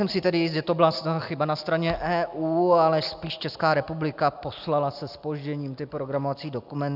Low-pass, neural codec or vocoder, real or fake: 5.4 kHz; none; real